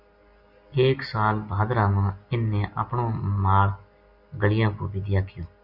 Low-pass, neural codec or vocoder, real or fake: 5.4 kHz; none; real